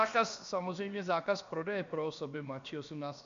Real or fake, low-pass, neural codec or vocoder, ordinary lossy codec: fake; 7.2 kHz; codec, 16 kHz, about 1 kbps, DyCAST, with the encoder's durations; MP3, 64 kbps